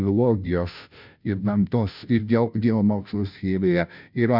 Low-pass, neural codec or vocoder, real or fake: 5.4 kHz; codec, 16 kHz, 0.5 kbps, FunCodec, trained on Chinese and English, 25 frames a second; fake